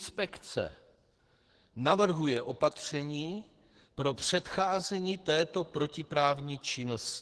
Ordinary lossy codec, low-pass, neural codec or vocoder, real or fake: Opus, 16 kbps; 10.8 kHz; codec, 44.1 kHz, 2.6 kbps, SNAC; fake